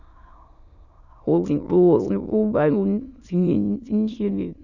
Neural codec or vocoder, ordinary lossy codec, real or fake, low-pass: autoencoder, 22.05 kHz, a latent of 192 numbers a frame, VITS, trained on many speakers; none; fake; 7.2 kHz